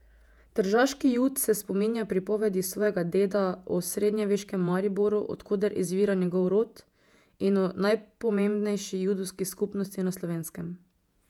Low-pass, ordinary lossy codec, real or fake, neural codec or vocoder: 19.8 kHz; none; fake; vocoder, 48 kHz, 128 mel bands, Vocos